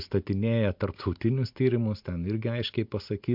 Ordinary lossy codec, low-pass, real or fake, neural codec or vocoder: MP3, 48 kbps; 5.4 kHz; real; none